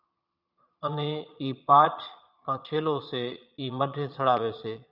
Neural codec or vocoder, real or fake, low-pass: vocoder, 22.05 kHz, 80 mel bands, Vocos; fake; 5.4 kHz